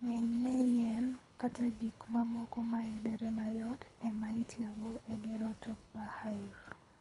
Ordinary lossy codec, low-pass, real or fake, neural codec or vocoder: none; 10.8 kHz; fake; codec, 24 kHz, 3 kbps, HILCodec